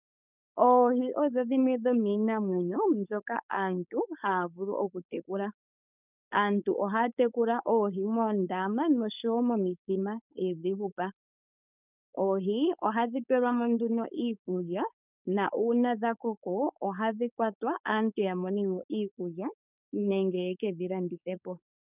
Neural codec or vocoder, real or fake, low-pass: codec, 16 kHz, 4.8 kbps, FACodec; fake; 3.6 kHz